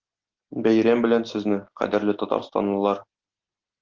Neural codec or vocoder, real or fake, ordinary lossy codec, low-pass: none; real; Opus, 16 kbps; 7.2 kHz